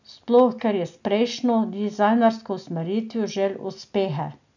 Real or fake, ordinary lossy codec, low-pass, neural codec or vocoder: real; none; 7.2 kHz; none